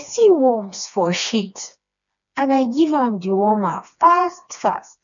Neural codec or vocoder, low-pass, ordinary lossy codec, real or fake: codec, 16 kHz, 2 kbps, FreqCodec, smaller model; 7.2 kHz; none; fake